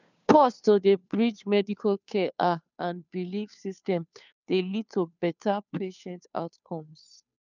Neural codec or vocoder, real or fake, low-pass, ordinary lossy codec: codec, 16 kHz, 2 kbps, FunCodec, trained on Chinese and English, 25 frames a second; fake; 7.2 kHz; none